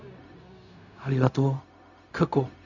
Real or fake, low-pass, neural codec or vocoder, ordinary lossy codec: fake; 7.2 kHz; codec, 16 kHz, 0.4 kbps, LongCat-Audio-Codec; none